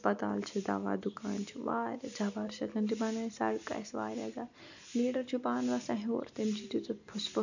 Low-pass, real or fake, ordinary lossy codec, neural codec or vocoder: 7.2 kHz; real; none; none